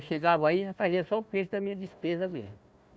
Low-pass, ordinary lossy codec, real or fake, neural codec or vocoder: none; none; fake; codec, 16 kHz, 1 kbps, FunCodec, trained on Chinese and English, 50 frames a second